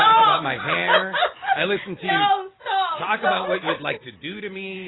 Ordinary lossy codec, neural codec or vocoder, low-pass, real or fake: AAC, 16 kbps; none; 7.2 kHz; real